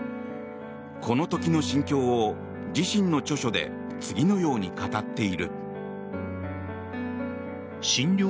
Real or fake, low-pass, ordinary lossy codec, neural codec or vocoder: real; none; none; none